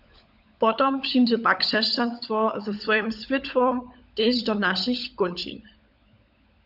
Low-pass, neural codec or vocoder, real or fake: 5.4 kHz; codec, 16 kHz, 16 kbps, FunCodec, trained on LibriTTS, 50 frames a second; fake